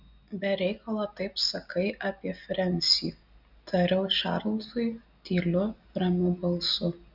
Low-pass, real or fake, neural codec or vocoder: 5.4 kHz; real; none